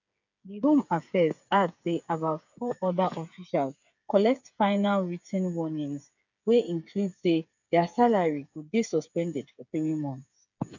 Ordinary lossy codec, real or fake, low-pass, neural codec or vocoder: none; fake; 7.2 kHz; codec, 16 kHz, 8 kbps, FreqCodec, smaller model